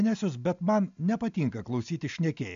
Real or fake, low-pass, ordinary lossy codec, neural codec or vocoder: real; 7.2 kHz; MP3, 64 kbps; none